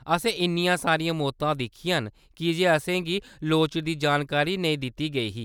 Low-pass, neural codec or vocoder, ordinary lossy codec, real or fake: 19.8 kHz; none; none; real